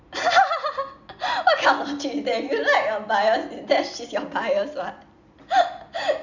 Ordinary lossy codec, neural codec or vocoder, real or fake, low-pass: none; none; real; 7.2 kHz